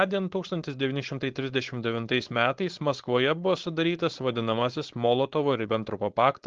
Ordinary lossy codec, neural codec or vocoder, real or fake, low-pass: Opus, 16 kbps; codec, 16 kHz, 4.8 kbps, FACodec; fake; 7.2 kHz